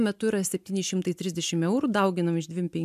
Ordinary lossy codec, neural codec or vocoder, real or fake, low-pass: MP3, 96 kbps; none; real; 14.4 kHz